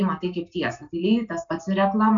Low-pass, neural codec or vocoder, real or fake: 7.2 kHz; none; real